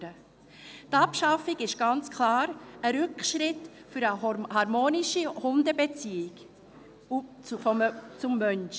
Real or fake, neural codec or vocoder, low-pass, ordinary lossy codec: real; none; none; none